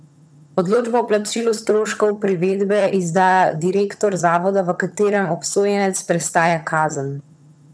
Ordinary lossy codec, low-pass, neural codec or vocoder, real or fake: none; none; vocoder, 22.05 kHz, 80 mel bands, HiFi-GAN; fake